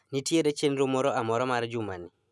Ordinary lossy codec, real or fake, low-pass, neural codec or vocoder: none; real; none; none